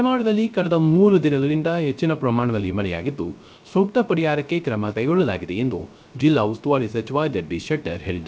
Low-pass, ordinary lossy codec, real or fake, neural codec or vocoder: none; none; fake; codec, 16 kHz, 0.3 kbps, FocalCodec